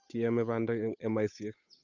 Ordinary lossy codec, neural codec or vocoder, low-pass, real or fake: none; codec, 16 kHz, 8 kbps, FunCodec, trained on Chinese and English, 25 frames a second; 7.2 kHz; fake